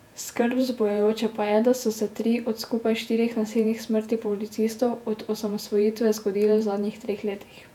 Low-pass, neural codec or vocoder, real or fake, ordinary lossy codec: 19.8 kHz; vocoder, 48 kHz, 128 mel bands, Vocos; fake; none